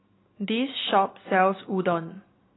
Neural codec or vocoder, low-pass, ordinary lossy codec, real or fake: none; 7.2 kHz; AAC, 16 kbps; real